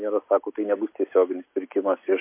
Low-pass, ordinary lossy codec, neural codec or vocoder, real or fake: 3.6 kHz; MP3, 24 kbps; none; real